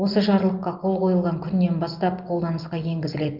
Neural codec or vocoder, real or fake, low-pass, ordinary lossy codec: none; real; 5.4 kHz; Opus, 24 kbps